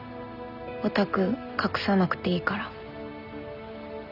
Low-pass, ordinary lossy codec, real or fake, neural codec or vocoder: 5.4 kHz; none; real; none